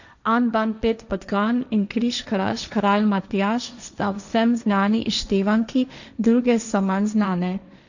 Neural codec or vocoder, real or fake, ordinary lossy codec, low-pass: codec, 16 kHz, 1.1 kbps, Voila-Tokenizer; fake; none; 7.2 kHz